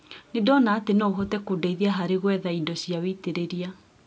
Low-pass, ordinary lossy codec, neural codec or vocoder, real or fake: none; none; none; real